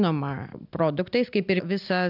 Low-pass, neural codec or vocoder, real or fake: 5.4 kHz; none; real